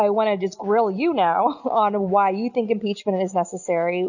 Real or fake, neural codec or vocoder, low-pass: real; none; 7.2 kHz